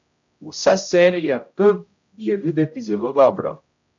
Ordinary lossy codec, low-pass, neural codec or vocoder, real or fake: AAC, 64 kbps; 7.2 kHz; codec, 16 kHz, 0.5 kbps, X-Codec, HuBERT features, trained on general audio; fake